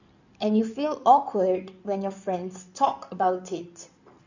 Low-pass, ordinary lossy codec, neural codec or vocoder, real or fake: 7.2 kHz; none; codec, 16 kHz in and 24 kHz out, 2.2 kbps, FireRedTTS-2 codec; fake